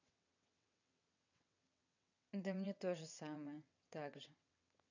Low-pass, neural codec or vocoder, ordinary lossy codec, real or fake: 7.2 kHz; vocoder, 22.05 kHz, 80 mel bands, WaveNeXt; none; fake